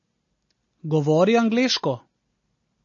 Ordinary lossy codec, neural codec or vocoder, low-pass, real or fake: MP3, 32 kbps; none; 7.2 kHz; real